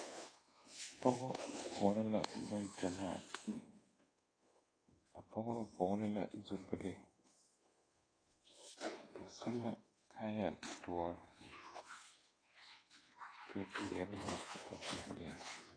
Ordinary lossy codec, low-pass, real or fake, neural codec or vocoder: AAC, 32 kbps; 9.9 kHz; fake; codec, 24 kHz, 1.2 kbps, DualCodec